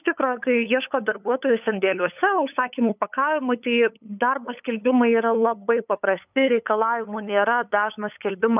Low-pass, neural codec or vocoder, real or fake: 3.6 kHz; codec, 16 kHz, 8 kbps, FunCodec, trained on LibriTTS, 25 frames a second; fake